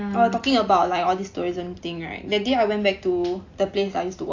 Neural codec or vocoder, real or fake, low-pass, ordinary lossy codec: none; real; 7.2 kHz; AAC, 48 kbps